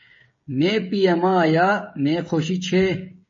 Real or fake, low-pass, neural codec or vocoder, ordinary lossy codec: fake; 7.2 kHz; codec, 16 kHz, 16 kbps, FreqCodec, smaller model; MP3, 32 kbps